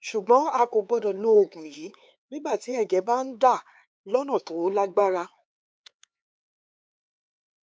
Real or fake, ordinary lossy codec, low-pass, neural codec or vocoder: fake; none; none; codec, 16 kHz, 4 kbps, X-Codec, HuBERT features, trained on LibriSpeech